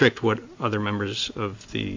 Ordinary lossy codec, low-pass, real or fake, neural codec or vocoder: AAC, 48 kbps; 7.2 kHz; real; none